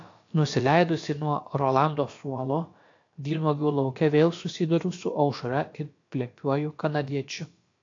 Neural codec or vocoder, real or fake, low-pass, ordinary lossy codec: codec, 16 kHz, about 1 kbps, DyCAST, with the encoder's durations; fake; 7.2 kHz; AAC, 48 kbps